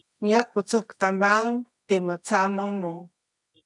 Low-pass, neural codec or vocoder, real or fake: 10.8 kHz; codec, 24 kHz, 0.9 kbps, WavTokenizer, medium music audio release; fake